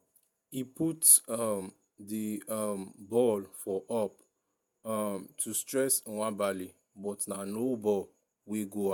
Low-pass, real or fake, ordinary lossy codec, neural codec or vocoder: none; fake; none; vocoder, 48 kHz, 128 mel bands, Vocos